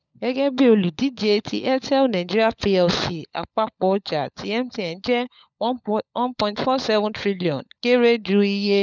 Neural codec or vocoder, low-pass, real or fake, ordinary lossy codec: codec, 16 kHz, 16 kbps, FunCodec, trained on LibriTTS, 50 frames a second; 7.2 kHz; fake; none